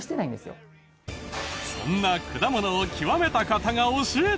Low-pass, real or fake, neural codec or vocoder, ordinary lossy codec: none; real; none; none